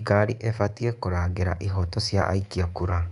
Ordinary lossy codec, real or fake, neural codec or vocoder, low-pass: none; fake; codec, 24 kHz, 3.1 kbps, DualCodec; 10.8 kHz